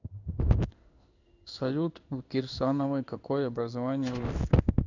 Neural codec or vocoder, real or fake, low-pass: codec, 16 kHz in and 24 kHz out, 1 kbps, XY-Tokenizer; fake; 7.2 kHz